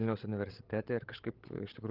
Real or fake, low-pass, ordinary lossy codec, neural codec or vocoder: fake; 5.4 kHz; Opus, 16 kbps; codec, 16 kHz, 16 kbps, FreqCodec, larger model